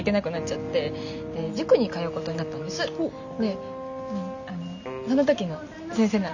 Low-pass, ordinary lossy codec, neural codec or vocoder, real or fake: 7.2 kHz; none; none; real